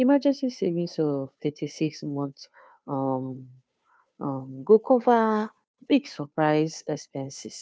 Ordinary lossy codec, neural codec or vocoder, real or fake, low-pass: none; codec, 16 kHz, 2 kbps, FunCodec, trained on Chinese and English, 25 frames a second; fake; none